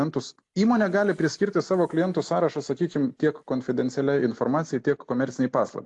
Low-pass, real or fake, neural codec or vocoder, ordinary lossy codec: 10.8 kHz; real; none; AAC, 64 kbps